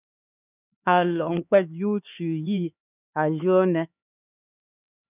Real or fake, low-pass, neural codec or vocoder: fake; 3.6 kHz; codec, 16 kHz, 4 kbps, X-Codec, HuBERT features, trained on LibriSpeech